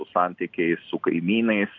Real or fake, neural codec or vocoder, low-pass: real; none; 7.2 kHz